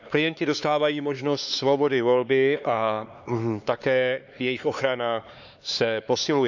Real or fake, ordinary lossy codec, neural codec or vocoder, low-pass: fake; none; codec, 16 kHz, 4 kbps, X-Codec, HuBERT features, trained on LibriSpeech; 7.2 kHz